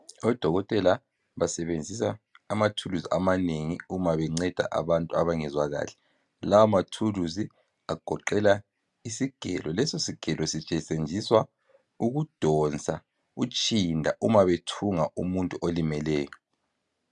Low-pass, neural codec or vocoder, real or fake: 10.8 kHz; none; real